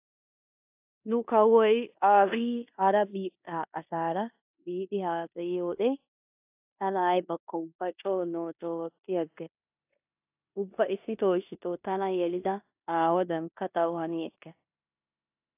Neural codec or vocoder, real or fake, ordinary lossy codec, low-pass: codec, 16 kHz in and 24 kHz out, 0.9 kbps, LongCat-Audio-Codec, four codebook decoder; fake; AAC, 32 kbps; 3.6 kHz